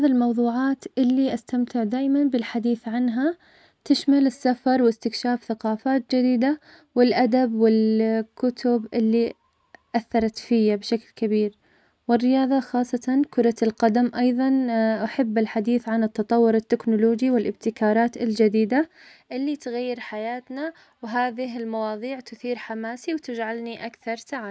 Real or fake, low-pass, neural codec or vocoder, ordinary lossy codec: real; none; none; none